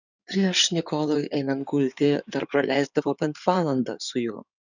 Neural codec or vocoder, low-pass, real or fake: codec, 16 kHz in and 24 kHz out, 2.2 kbps, FireRedTTS-2 codec; 7.2 kHz; fake